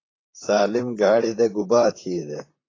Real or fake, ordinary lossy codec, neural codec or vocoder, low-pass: fake; AAC, 32 kbps; vocoder, 44.1 kHz, 128 mel bands, Pupu-Vocoder; 7.2 kHz